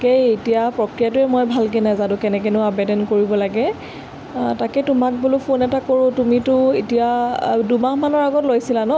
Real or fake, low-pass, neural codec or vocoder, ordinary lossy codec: real; none; none; none